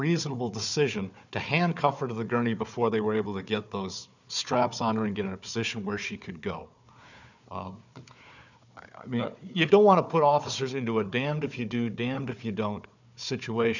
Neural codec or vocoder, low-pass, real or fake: codec, 16 kHz, 4 kbps, FunCodec, trained on Chinese and English, 50 frames a second; 7.2 kHz; fake